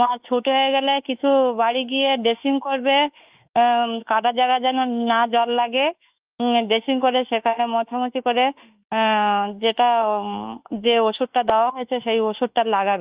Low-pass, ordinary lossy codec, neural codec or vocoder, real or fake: 3.6 kHz; Opus, 24 kbps; autoencoder, 48 kHz, 32 numbers a frame, DAC-VAE, trained on Japanese speech; fake